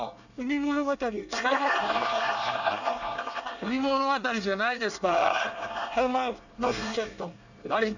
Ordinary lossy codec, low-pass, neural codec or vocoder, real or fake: none; 7.2 kHz; codec, 24 kHz, 1 kbps, SNAC; fake